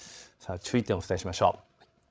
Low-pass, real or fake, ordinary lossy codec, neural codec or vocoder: none; fake; none; codec, 16 kHz, 16 kbps, FreqCodec, larger model